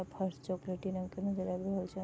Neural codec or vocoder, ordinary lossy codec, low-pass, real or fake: none; none; none; real